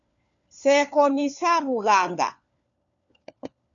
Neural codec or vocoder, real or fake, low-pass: codec, 16 kHz, 4 kbps, FunCodec, trained on LibriTTS, 50 frames a second; fake; 7.2 kHz